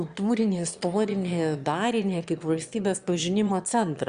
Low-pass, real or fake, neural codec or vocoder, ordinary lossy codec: 9.9 kHz; fake; autoencoder, 22.05 kHz, a latent of 192 numbers a frame, VITS, trained on one speaker; Opus, 64 kbps